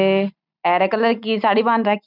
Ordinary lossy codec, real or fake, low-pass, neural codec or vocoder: none; real; 5.4 kHz; none